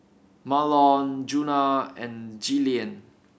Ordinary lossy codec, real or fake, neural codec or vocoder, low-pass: none; real; none; none